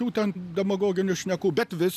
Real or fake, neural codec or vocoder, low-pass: real; none; 14.4 kHz